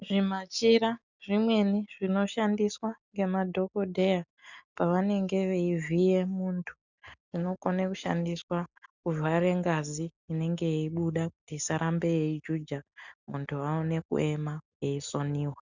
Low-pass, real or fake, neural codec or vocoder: 7.2 kHz; real; none